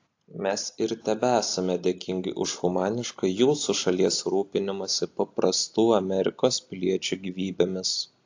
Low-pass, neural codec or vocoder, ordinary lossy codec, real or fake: 7.2 kHz; none; AAC, 48 kbps; real